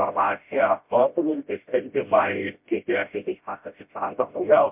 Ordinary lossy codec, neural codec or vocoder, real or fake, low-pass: MP3, 32 kbps; codec, 16 kHz, 0.5 kbps, FreqCodec, smaller model; fake; 3.6 kHz